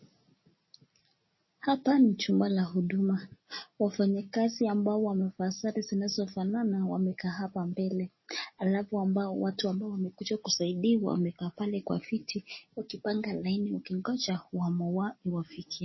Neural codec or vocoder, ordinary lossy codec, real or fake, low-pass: none; MP3, 24 kbps; real; 7.2 kHz